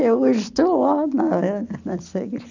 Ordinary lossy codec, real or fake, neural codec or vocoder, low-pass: none; real; none; 7.2 kHz